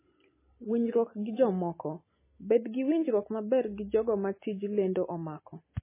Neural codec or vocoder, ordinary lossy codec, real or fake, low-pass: none; MP3, 16 kbps; real; 3.6 kHz